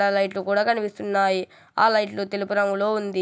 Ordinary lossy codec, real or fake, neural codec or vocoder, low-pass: none; real; none; none